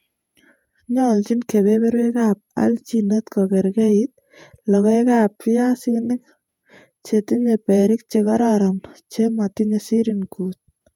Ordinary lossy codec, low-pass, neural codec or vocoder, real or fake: MP3, 96 kbps; 19.8 kHz; vocoder, 48 kHz, 128 mel bands, Vocos; fake